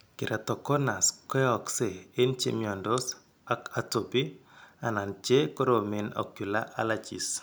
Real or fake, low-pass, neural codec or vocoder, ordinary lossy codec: real; none; none; none